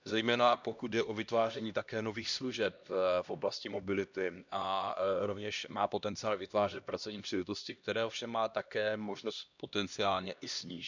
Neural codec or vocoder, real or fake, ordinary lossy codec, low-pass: codec, 16 kHz, 1 kbps, X-Codec, HuBERT features, trained on LibriSpeech; fake; none; 7.2 kHz